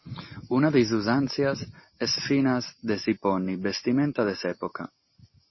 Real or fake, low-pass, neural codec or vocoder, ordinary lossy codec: real; 7.2 kHz; none; MP3, 24 kbps